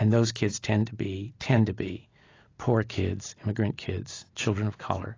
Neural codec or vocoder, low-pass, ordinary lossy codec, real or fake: none; 7.2 kHz; AAC, 32 kbps; real